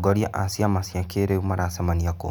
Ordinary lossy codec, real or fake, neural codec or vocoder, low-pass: none; real; none; none